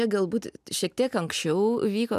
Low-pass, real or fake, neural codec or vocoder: 14.4 kHz; real; none